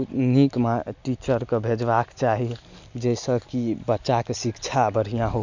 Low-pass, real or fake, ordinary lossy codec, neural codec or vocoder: 7.2 kHz; real; none; none